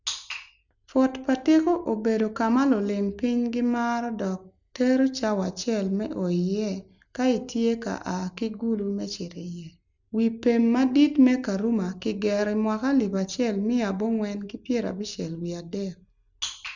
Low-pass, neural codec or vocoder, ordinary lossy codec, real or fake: 7.2 kHz; none; none; real